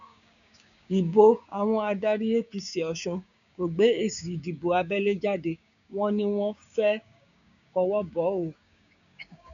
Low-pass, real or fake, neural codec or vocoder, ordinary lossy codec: 7.2 kHz; fake; codec, 16 kHz, 6 kbps, DAC; none